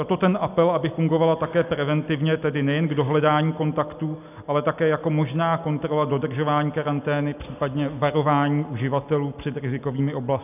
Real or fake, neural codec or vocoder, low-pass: real; none; 3.6 kHz